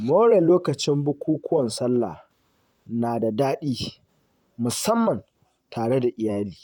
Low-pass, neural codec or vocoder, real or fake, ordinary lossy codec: 19.8 kHz; vocoder, 44.1 kHz, 128 mel bands, Pupu-Vocoder; fake; none